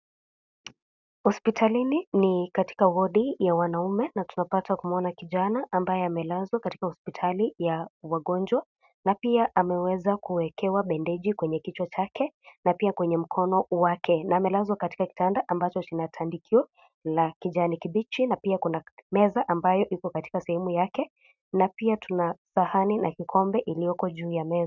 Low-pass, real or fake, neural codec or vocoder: 7.2 kHz; real; none